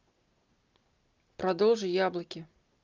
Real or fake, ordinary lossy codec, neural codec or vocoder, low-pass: real; Opus, 32 kbps; none; 7.2 kHz